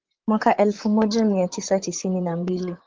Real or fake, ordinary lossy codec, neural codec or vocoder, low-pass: fake; Opus, 16 kbps; vocoder, 44.1 kHz, 128 mel bands, Pupu-Vocoder; 7.2 kHz